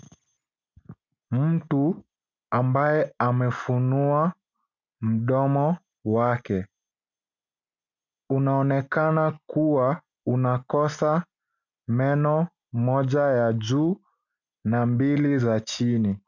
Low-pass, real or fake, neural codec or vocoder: 7.2 kHz; real; none